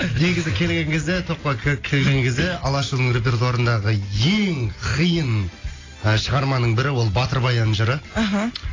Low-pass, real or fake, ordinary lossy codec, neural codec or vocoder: 7.2 kHz; real; AAC, 32 kbps; none